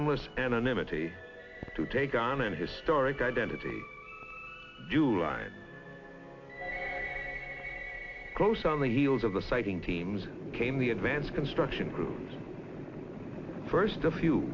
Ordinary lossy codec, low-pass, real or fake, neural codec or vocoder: AAC, 48 kbps; 7.2 kHz; real; none